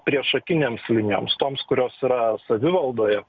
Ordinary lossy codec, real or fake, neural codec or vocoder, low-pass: Opus, 64 kbps; real; none; 7.2 kHz